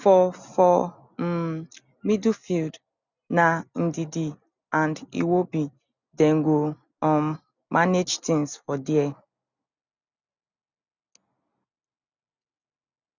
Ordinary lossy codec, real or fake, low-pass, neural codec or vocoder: none; real; 7.2 kHz; none